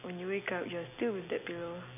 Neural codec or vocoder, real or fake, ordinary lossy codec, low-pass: none; real; none; 3.6 kHz